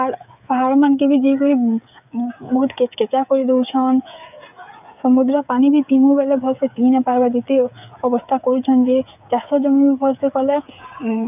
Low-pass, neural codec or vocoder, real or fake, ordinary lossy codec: 3.6 kHz; codec, 16 kHz, 8 kbps, FreqCodec, smaller model; fake; none